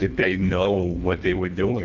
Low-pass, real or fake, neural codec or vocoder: 7.2 kHz; fake; codec, 24 kHz, 1.5 kbps, HILCodec